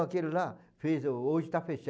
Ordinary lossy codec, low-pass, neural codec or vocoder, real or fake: none; none; none; real